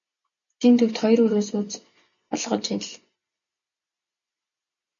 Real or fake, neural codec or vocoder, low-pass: real; none; 7.2 kHz